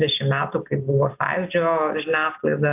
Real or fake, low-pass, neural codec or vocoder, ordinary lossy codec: real; 3.6 kHz; none; AAC, 32 kbps